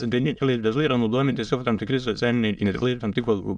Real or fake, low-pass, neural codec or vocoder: fake; 9.9 kHz; autoencoder, 22.05 kHz, a latent of 192 numbers a frame, VITS, trained on many speakers